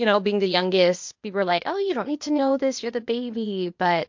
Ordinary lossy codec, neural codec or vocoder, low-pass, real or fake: MP3, 48 kbps; codec, 16 kHz, 0.8 kbps, ZipCodec; 7.2 kHz; fake